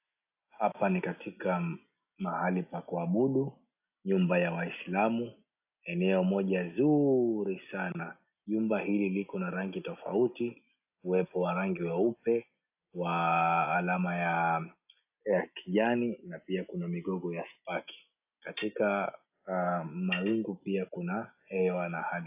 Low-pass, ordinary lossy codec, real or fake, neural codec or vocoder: 3.6 kHz; AAC, 32 kbps; real; none